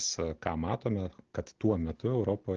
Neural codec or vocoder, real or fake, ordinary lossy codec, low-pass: none; real; Opus, 16 kbps; 7.2 kHz